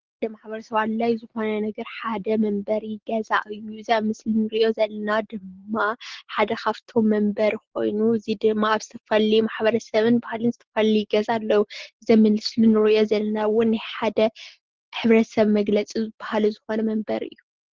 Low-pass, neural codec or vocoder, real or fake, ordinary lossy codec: 7.2 kHz; none; real; Opus, 16 kbps